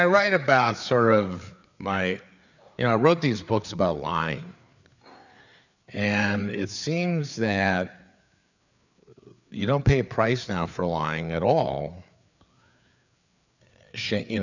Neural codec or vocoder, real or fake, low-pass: codec, 16 kHz, 4 kbps, FreqCodec, larger model; fake; 7.2 kHz